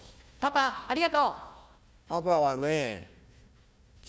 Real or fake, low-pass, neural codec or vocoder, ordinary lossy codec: fake; none; codec, 16 kHz, 1 kbps, FunCodec, trained on Chinese and English, 50 frames a second; none